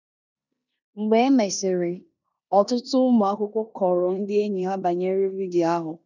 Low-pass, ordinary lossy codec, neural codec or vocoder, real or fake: 7.2 kHz; none; codec, 16 kHz in and 24 kHz out, 0.9 kbps, LongCat-Audio-Codec, four codebook decoder; fake